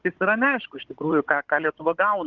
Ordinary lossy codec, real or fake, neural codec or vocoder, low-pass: Opus, 16 kbps; fake; codec, 16 kHz, 16 kbps, FunCodec, trained on Chinese and English, 50 frames a second; 7.2 kHz